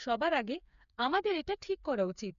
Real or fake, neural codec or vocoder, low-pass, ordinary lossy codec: fake; codec, 16 kHz, 4 kbps, FreqCodec, smaller model; 7.2 kHz; none